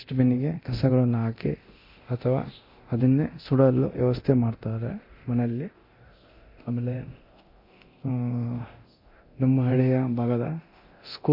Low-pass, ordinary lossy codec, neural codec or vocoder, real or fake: 5.4 kHz; MP3, 32 kbps; codec, 24 kHz, 0.9 kbps, DualCodec; fake